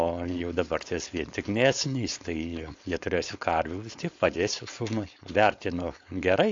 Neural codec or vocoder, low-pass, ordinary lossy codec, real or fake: codec, 16 kHz, 4.8 kbps, FACodec; 7.2 kHz; AAC, 48 kbps; fake